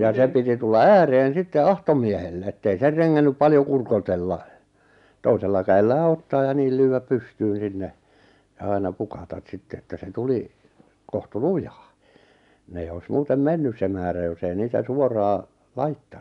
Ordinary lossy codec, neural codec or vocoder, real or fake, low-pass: none; none; real; 10.8 kHz